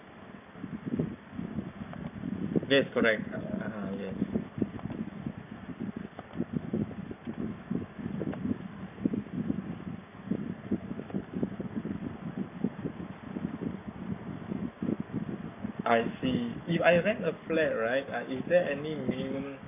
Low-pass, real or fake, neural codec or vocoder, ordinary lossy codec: 3.6 kHz; fake; codec, 44.1 kHz, 7.8 kbps, Pupu-Codec; none